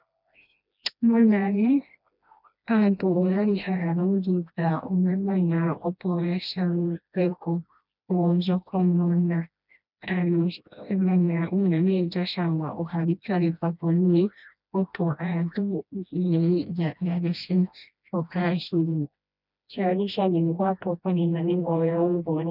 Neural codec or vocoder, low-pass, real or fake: codec, 16 kHz, 1 kbps, FreqCodec, smaller model; 5.4 kHz; fake